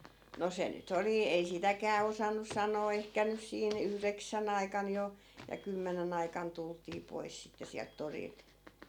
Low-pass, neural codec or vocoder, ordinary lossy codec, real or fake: 19.8 kHz; none; none; real